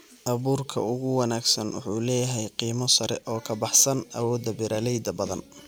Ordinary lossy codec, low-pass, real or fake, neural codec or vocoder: none; none; real; none